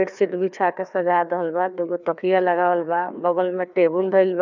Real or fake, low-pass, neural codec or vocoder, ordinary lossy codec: fake; 7.2 kHz; codec, 16 kHz, 2 kbps, FreqCodec, larger model; none